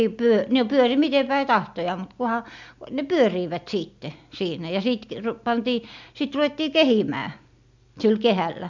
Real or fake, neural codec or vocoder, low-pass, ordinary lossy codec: real; none; 7.2 kHz; MP3, 64 kbps